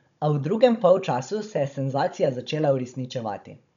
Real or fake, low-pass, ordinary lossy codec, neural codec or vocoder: fake; 7.2 kHz; none; codec, 16 kHz, 16 kbps, FunCodec, trained on Chinese and English, 50 frames a second